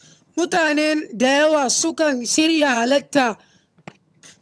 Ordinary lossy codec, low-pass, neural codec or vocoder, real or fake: none; none; vocoder, 22.05 kHz, 80 mel bands, HiFi-GAN; fake